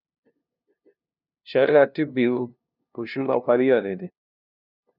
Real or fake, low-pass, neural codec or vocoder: fake; 5.4 kHz; codec, 16 kHz, 0.5 kbps, FunCodec, trained on LibriTTS, 25 frames a second